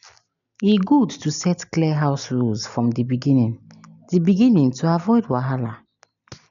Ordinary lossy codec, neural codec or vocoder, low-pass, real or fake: none; none; 7.2 kHz; real